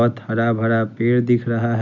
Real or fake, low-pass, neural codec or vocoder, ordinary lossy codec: real; 7.2 kHz; none; none